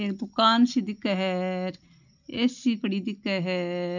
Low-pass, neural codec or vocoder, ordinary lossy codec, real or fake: 7.2 kHz; none; none; real